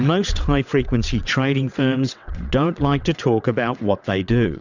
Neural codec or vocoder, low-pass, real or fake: vocoder, 22.05 kHz, 80 mel bands, WaveNeXt; 7.2 kHz; fake